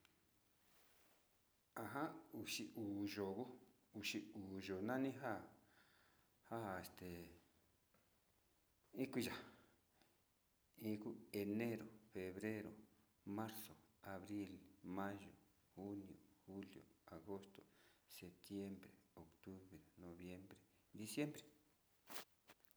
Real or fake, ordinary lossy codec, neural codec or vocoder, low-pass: real; none; none; none